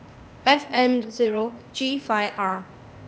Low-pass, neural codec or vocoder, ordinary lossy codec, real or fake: none; codec, 16 kHz, 0.8 kbps, ZipCodec; none; fake